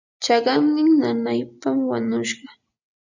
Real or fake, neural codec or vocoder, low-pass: real; none; 7.2 kHz